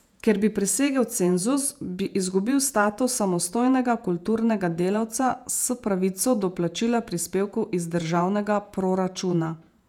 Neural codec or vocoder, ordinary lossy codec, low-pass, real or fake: vocoder, 48 kHz, 128 mel bands, Vocos; none; 19.8 kHz; fake